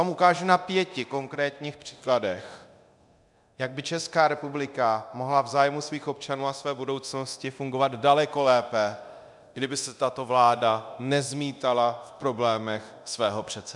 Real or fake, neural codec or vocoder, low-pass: fake; codec, 24 kHz, 0.9 kbps, DualCodec; 10.8 kHz